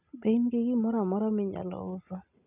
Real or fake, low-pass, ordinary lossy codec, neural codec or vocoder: real; 3.6 kHz; none; none